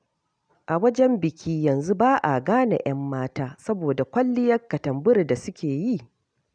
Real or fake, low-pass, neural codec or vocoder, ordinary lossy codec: real; 9.9 kHz; none; none